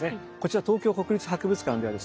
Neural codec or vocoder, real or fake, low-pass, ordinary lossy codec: none; real; none; none